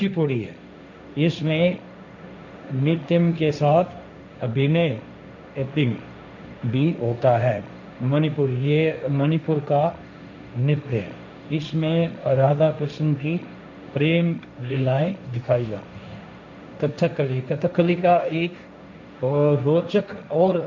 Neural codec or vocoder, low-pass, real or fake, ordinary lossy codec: codec, 16 kHz, 1.1 kbps, Voila-Tokenizer; 7.2 kHz; fake; none